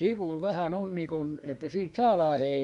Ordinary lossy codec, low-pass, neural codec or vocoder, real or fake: none; 10.8 kHz; codec, 24 kHz, 1 kbps, SNAC; fake